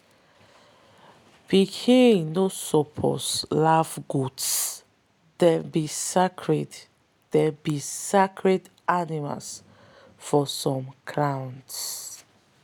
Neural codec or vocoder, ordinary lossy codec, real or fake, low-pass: none; none; real; 19.8 kHz